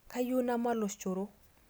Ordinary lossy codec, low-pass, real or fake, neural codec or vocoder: none; none; real; none